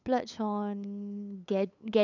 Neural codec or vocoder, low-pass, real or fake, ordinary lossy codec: codec, 16 kHz, 8 kbps, FunCodec, trained on LibriTTS, 25 frames a second; 7.2 kHz; fake; none